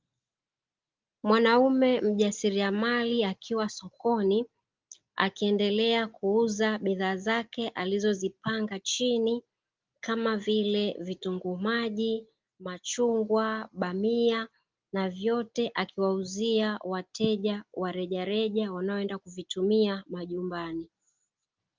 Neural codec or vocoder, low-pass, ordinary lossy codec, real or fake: none; 7.2 kHz; Opus, 32 kbps; real